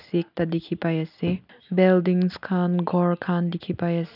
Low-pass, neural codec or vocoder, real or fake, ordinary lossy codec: 5.4 kHz; none; real; none